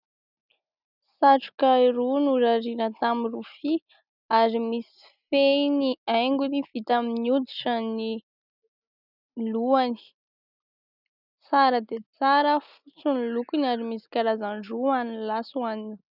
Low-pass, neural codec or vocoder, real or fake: 5.4 kHz; none; real